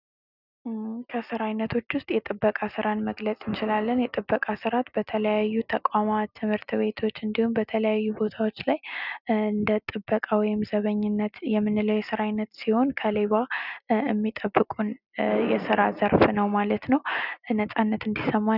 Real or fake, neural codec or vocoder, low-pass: real; none; 5.4 kHz